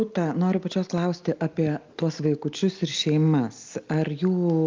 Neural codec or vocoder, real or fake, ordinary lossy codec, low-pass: none; real; Opus, 24 kbps; 7.2 kHz